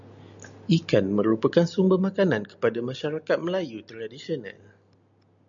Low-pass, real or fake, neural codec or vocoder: 7.2 kHz; real; none